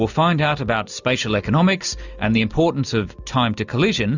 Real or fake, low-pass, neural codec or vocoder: real; 7.2 kHz; none